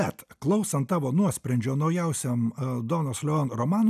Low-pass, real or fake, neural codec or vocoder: 14.4 kHz; real; none